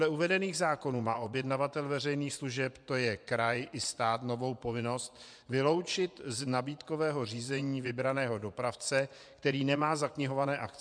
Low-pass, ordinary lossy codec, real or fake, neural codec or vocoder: 9.9 kHz; MP3, 96 kbps; fake; vocoder, 24 kHz, 100 mel bands, Vocos